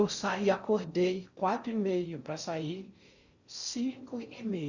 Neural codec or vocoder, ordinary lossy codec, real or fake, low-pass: codec, 16 kHz in and 24 kHz out, 0.6 kbps, FocalCodec, streaming, 4096 codes; Opus, 64 kbps; fake; 7.2 kHz